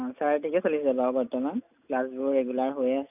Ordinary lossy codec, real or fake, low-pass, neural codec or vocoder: none; real; 3.6 kHz; none